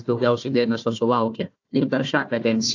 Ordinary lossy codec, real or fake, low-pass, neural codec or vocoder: AAC, 48 kbps; fake; 7.2 kHz; codec, 16 kHz, 1 kbps, FunCodec, trained on Chinese and English, 50 frames a second